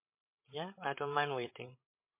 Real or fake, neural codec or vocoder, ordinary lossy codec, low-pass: fake; codec, 16 kHz, 16 kbps, FreqCodec, larger model; MP3, 24 kbps; 3.6 kHz